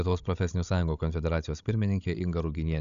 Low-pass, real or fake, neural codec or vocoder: 7.2 kHz; fake; codec, 16 kHz, 16 kbps, FreqCodec, larger model